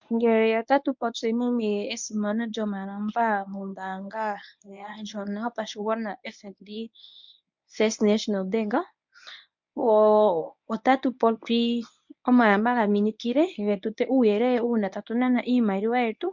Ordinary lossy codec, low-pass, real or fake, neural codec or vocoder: MP3, 64 kbps; 7.2 kHz; fake; codec, 24 kHz, 0.9 kbps, WavTokenizer, medium speech release version 1